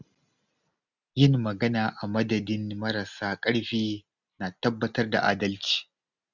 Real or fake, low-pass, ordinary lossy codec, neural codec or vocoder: real; 7.2 kHz; none; none